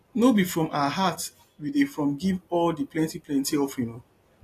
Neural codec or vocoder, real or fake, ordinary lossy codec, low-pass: none; real; AAC, 48 kbps; 14.4 kHz